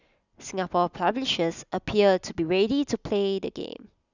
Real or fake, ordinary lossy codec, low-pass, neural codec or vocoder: real; none; 7.2 kHz; none